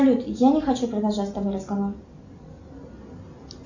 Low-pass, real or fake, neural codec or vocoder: 7.2 kHz; real; none